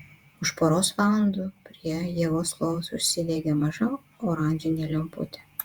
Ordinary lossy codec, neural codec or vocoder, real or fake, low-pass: Opus, 64 kbps; vocoder, 48 kHz, 128 mel bands, Vocos; fake; 19.8 kHz